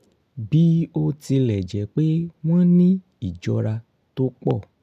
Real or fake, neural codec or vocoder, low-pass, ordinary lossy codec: real; none; 14.4 kHz; none